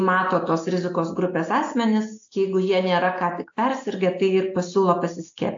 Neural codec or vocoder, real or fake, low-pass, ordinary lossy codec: none; real; 7.2 kHz; AAC, 48 kbps